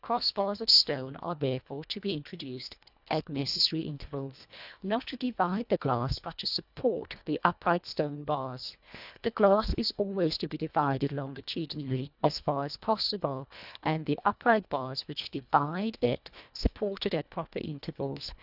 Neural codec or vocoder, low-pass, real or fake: codec, 24 kHz, 1.5 kbps, HILCodec; 5.4 kHz; fake